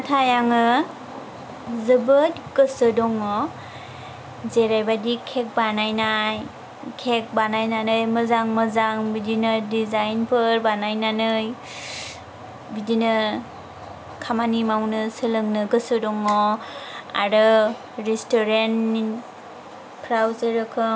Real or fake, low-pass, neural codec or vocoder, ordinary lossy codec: real; none; none; none